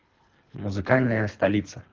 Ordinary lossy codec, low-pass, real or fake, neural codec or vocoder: Opus, 24 kbps; 7.2 kHz; fake; codec, 24 kHz, 3 kbps, HILCodec